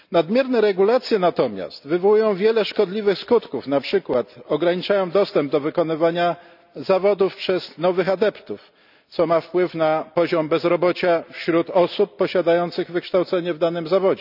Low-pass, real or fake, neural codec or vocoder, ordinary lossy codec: 5.4 kHz; real; none; none